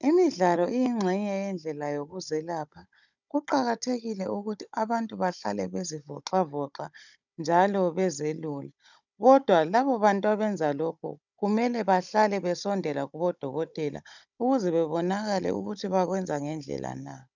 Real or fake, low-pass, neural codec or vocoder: fake; 7.2 kHz; codec, 16 kHz, 16 kbps, FunCodec, trained on Chinese and English, 50 frames a second